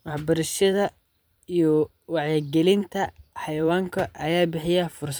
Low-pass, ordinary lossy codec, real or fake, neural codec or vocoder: none; none; fake; vocoder, 44.1 kHz, 128 mel bands every 512 samples, BigVGAN v2